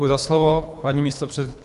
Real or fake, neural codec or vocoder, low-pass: fake; codec, 24 kHz, 3 kbps, HILCodec; 10.8 kHz